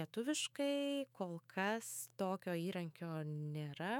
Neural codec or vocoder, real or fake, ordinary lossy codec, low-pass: autoencoder, 48 kHz, 128 numbers a frame, DAC-VAE, trained on Japanese speech; fake; MP3, 96 kbps; 19.8 kHz